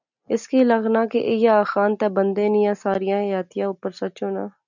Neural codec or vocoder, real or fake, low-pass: none; real; 7.2 kHz